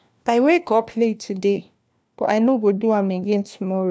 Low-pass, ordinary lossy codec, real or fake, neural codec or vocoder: none; none; fake; codec, 16 kHz, 1 kbps, FunCodec, trained on LibriTTS, 50 frames a second